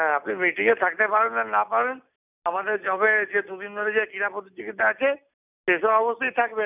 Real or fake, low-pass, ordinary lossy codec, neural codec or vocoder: real; 3.6 kHz; none; none